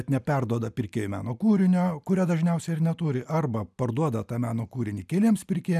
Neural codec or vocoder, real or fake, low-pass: none; real; 14.4 kHz